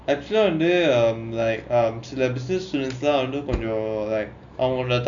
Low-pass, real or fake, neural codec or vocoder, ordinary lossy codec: 7.2 kHz; real; none; MP3, 64 kbps